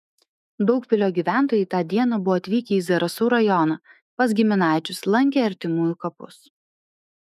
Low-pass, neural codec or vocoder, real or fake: 14.4 kHz; autoencoder, 48 kHz, 128 numbers a frame, DAC-VAE, trained on Japanese speech; fake